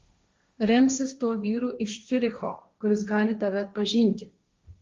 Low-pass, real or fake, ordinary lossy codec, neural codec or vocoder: 7.2 kHz; fake; Opus, 32 kbps; codec, 16 kHz, 1.1 kbps, Voila-Tokenizer